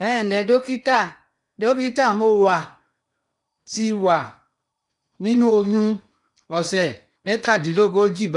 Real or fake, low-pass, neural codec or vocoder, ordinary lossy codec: fake; 10.8 kHz; codec, 16 kHz in and 24 kHz out, 0.8 kbps, FocalCodec, streaming, 65536 codes; none